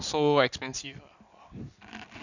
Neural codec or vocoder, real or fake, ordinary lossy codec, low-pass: none; real; none; 7.2 kHz